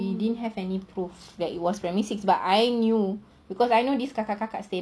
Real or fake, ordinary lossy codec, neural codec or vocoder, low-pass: real; none; none; none